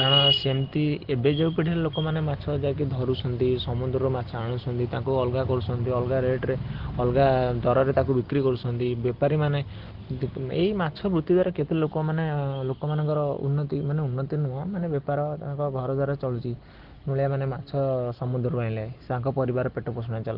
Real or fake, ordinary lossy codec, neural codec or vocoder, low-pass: real; Opus, 16 kbps; none; 5.4 kHz